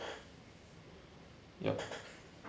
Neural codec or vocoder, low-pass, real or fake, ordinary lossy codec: none; none; real; none